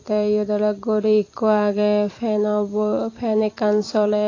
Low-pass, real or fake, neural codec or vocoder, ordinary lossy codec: 7.2 kHz; fake; vocoder, 44.1 kHz, 128 mel bands every 256 samples, BigVGAN v2; AAC, 32 kbps